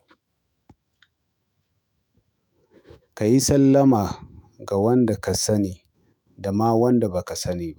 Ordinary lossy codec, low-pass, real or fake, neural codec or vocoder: none; none; fake; autoencoder, 48 kHz, 128 numbers a frame, DAC-VAE, trained on Japanese speech